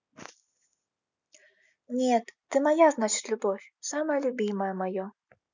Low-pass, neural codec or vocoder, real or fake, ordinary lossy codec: 7.2 kHz; none; real; none